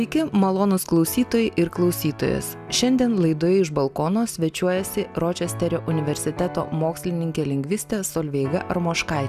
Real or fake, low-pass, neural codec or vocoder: real; 14.4 kHz; none